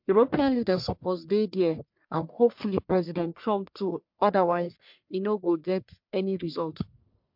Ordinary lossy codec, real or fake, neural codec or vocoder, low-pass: MP3, 48 kbps; fake; codec, 44.1 kHz, 1.7 kbps, Pupu-Codec; 5.4 kHz